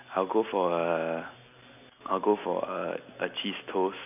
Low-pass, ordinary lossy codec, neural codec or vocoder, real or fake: 3.6 kHz; none; none; real